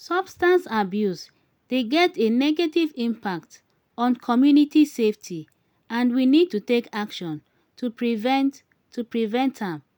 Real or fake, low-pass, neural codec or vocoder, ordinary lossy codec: real; none; none; none